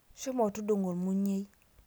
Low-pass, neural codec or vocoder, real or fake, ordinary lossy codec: none; none; real; none